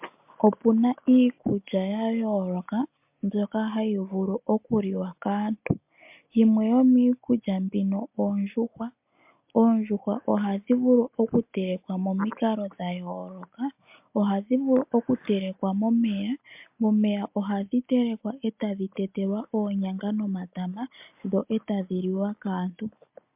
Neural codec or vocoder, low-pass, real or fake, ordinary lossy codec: none; 3.6 kHz; real; MP3, 32 kbps